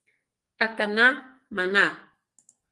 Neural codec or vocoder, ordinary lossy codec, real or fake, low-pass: codec, 44.1 kHz, 2.6 kbps, SNAC; Opus, 24 kbps; fake; 10.8 kHz